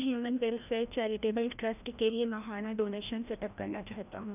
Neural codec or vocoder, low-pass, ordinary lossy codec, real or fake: codec, 16 kHz, 1 kbps, FreqCodec, larger model; 3.6 kHz; none; fake